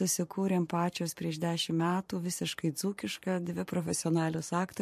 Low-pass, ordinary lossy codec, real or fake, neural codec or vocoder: 14.4 kHz; MP3, 64 kbps; fake; vocoder, 44.1 kHz, 128 mel bands every 512 samples, BigVGAN v2